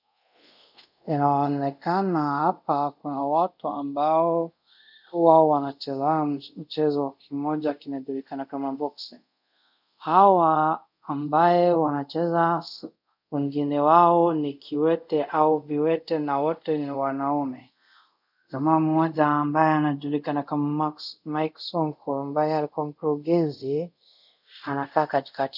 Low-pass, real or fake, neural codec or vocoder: 5.4 kHz; fake; codec, 24 kHz, 0.5 kbps, DualCodec